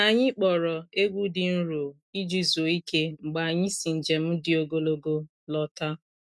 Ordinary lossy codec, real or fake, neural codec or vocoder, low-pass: none; real; none; none